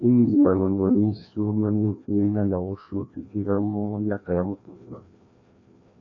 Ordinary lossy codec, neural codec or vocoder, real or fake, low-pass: MP3, 48 kbps; codec, 16 kHz, 1 kbps, FreqCodec, larger model; fake; 7.2 kHz